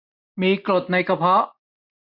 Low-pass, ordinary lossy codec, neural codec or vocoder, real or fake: 5.4 kHz; none; none; real